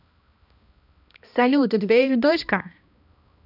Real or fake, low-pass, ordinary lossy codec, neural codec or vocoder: fake; 5.4 kHz; none; codec, 16 kHz, 1 kbps, X-Codec, HuBERT features, trained on balanced general audio